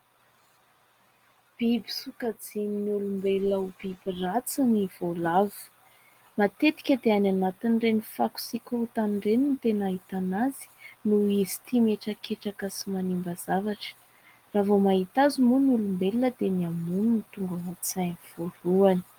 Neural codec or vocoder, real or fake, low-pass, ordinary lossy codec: none; real; 19.8 kHz; Opus, 24 kbps